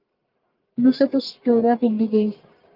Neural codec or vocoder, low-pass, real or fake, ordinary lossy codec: codec, 44.1 kHz, 1.7 kbps, Pupu-Codec; 5.4 kHz; fake; Opus, 32 kbps